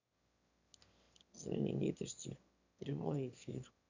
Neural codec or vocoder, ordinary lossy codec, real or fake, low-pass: autoencoder, 22.05 kHz, a latent of 192 numbers a frame, VITS, trained on one speaker; none; fake; 7.2 kHz